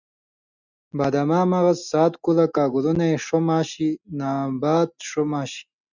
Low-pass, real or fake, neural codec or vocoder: 7.2 kHz; real; none